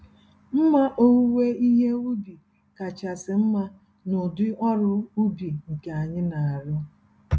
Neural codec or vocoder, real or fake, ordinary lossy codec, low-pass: none; real; none; none